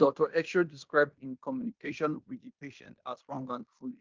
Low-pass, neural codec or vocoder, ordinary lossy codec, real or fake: 7.2 kHz; codec, 16 kHz in and 24 kHz out, 0.9 kbps, LongCat-Audio-Codec, fine tuned four codebook decoder; Opus, 24 kbps; fake